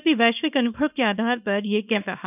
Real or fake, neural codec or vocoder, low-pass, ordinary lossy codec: fake; codec, 24 kHz, 0.9 kbps, WavTokenizer, small release; 3.6 kHz; none